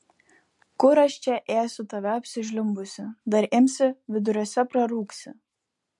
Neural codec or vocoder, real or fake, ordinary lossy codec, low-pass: none; real; MP3, 64 kbps; 10.8 kHz